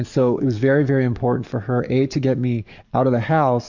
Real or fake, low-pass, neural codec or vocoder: fake; 7.2 kHz; codec, 44.1 kHz, 7.8 kbps, DAC